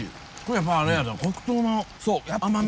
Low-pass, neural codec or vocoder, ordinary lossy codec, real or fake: none; none; none; real